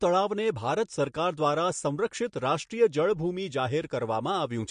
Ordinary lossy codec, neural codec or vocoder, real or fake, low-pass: MP3, 48 kbps; none; real; 9.9 kHz